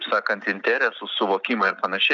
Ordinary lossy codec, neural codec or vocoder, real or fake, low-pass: MP3, 96 kbps; none; real; 7.2 kHz